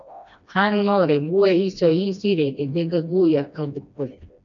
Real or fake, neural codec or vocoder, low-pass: fake; codec, 16 kHz, 1 kbps, FreqCodec, smaller model; 7.2 kHz